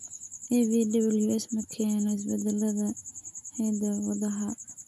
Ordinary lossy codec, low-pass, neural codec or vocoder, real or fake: none; 14.4 kHz; none; real